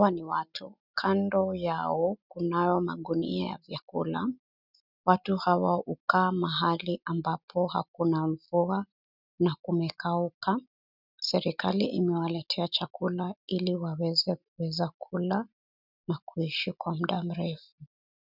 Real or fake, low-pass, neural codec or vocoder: real; 5.4 kHz; none